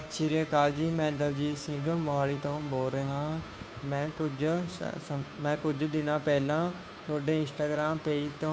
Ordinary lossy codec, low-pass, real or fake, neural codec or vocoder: none; none; fake; codec, 16 kHz, 2 kbps, FunCodec, trained on Chinese and English, 25 frames a second